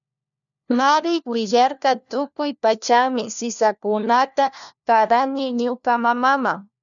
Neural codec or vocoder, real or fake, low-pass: codec, 16 kHz, 1 kbps, FunCodec, trained on LibriTTS, 50 frames a second; fake; 7.2 kHz